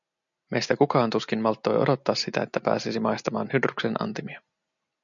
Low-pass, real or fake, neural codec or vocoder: 7.2 kHz; real; none